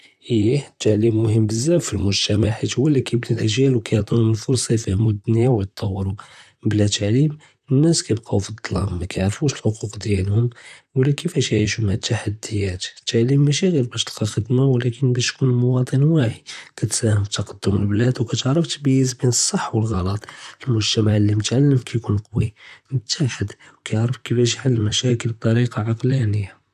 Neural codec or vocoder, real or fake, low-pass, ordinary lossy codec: vocoder, 44.1 kHz, 128 mel bands, Pupu-Vocoder; fake; 14.4 kHz; none